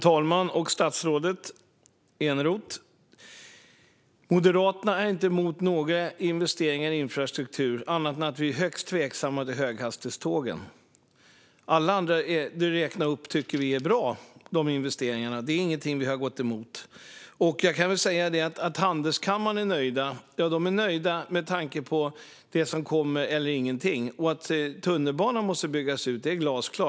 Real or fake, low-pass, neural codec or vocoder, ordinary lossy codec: real; none; none; none